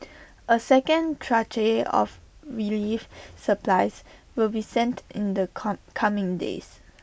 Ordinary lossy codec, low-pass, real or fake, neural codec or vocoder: none; none; real; none